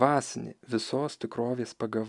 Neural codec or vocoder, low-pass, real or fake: none; 10.8 kHz; real